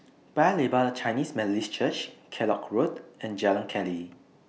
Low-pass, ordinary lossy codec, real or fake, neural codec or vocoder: none; none; real; none